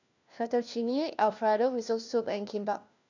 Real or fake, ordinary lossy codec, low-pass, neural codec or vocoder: fake; none; 7.2 kHz; codec, 16 kHz, 1 kbps, FunCodec, trained on LibriTTS, 50 frames a second